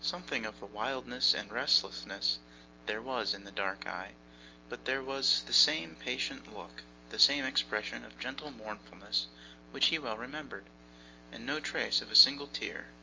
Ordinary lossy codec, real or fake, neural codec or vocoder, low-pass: Opus, 32 kbps; real; none; 7.2 kHz